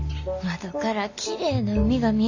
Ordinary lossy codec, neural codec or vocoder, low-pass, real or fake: AAC, 48 kbps; none; 7.2 kHz; real